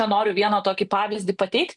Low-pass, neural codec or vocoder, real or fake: 10.8 kHz; none; real